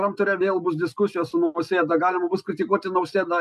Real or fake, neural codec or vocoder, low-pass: real; none; 14.4 kHz